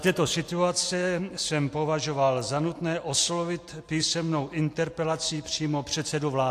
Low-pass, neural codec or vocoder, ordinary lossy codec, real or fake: 14.4 kHz; none; AAC, 64 kbps; real